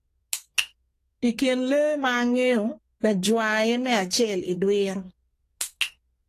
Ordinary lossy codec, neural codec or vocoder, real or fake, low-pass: AAC, 48 kbps; codec, 32 kHz, 1.9 kbps, SNAC; fake; 14.4 kHz